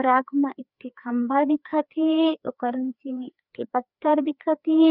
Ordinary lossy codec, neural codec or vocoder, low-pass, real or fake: none; codec, 16 kHz, 2 kbps, FreqCodec, larger model; 5.4 kHz; fake